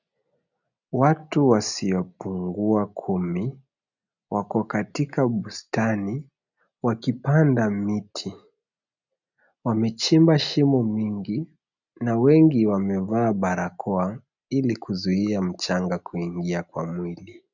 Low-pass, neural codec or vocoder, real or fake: 7.2 kHz; none; real